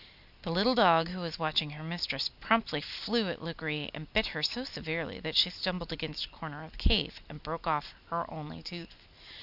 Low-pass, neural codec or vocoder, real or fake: 5.4 kHz; none; real